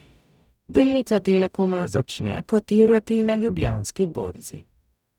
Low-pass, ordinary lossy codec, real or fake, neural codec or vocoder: 19.8 kHz; none; fake; codec, 44.1 kHz, 0.9 kbps, DAC